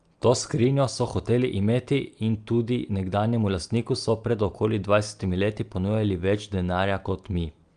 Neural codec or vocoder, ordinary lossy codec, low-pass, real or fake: none; Opus, 32 kbps; 9.9 kHz; real